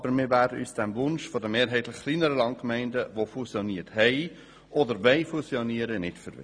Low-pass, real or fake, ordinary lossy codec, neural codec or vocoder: 9.9 kHz; real; none; none